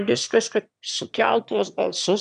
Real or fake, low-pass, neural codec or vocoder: fake; 9.9 kHz; autoencoder, 22.05 kHz, a latent of 192 numbers a frame, VITS, trained on one speaker